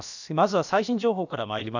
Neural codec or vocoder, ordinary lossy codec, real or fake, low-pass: codec, 16 kHz, about 1 kbps, DyCAST, with the encoder's durations; none; fake; 7.2 kHz